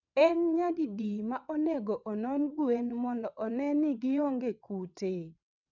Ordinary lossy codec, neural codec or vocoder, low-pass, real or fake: none; vocoder, 22.05 kHz, 80 mel bands, WaveNeXt; 7.2 kHz; fake